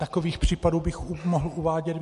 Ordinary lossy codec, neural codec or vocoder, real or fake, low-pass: MP3, 48 kbps; none; real; 14.4 kHz